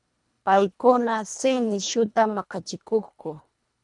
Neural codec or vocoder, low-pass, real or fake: codec, 24 kHz, 1.5 kbps, HILCodec; 10.8 kHz; fake